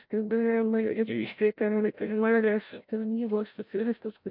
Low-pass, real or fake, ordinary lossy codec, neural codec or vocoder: 5.4 kHz; fake; MP3, 48 kbps; codec, 16 kHz, 0.5 kbps, FreqCodec, larger model